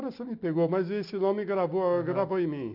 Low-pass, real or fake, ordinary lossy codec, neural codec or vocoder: 5.4 kHz; real; none; none